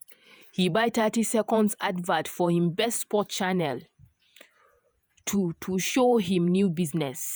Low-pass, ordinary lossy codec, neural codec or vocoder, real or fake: none; none; vocoder, 48 kHz, 128 mel bands, Vocos; fake